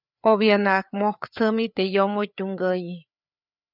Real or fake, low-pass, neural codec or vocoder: fake; 5.4 kHz; codec, 16 kHz, 4 kbps, FreqCodec, larger model